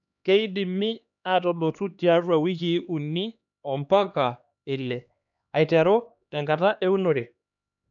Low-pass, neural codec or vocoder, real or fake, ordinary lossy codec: 7.2 kHz; codec, 16 kHz, 2 kbps, X-Codec, HuBERT features, trained on LibriSpeech; fake; none